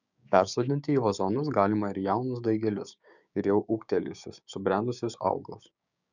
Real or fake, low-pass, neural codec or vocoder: fake; 7.2 kHz; codec, 16 kHz, 6 kbps, DAC